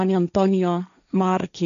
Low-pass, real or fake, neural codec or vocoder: 7.2 kHz; fake; codec, 16 kHz, 1.1 kbps, Voila-Tokenizer